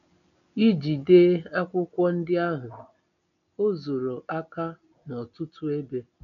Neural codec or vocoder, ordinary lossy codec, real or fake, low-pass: none; none; real; 7.2 kHz